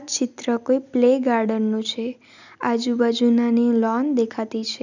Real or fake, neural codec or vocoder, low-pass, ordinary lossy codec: real; none; 7.2 kHz; none